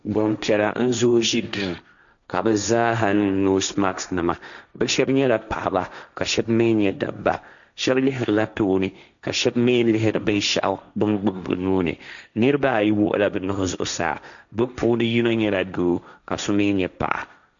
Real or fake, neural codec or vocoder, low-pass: fake; codec, 16 kHz, 1.1 kbps, Voila-Tokenizer; 7.2 kHz